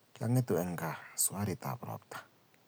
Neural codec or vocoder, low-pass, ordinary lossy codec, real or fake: none; none; none; real